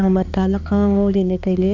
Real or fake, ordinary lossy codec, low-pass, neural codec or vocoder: fake; none; 7.2 kHz; codec, 16 kHz, 2 kbps, X-Codec, HuBERT features, trained on balanced general audio